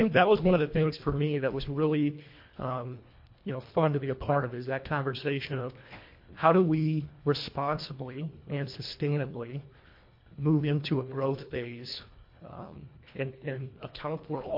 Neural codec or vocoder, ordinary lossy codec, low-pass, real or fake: codec, 24 kHz, 1.5 kbps, HILCodec; MP3, 32 kbps; 5.4 kHz; fake